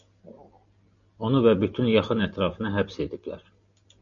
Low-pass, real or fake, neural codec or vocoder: 7.2 kHz; real; none